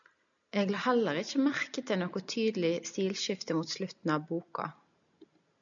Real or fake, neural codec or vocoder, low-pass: real; none; 7.2 kHz